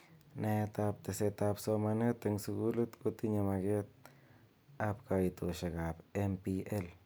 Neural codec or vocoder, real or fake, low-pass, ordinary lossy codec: none; real; none; none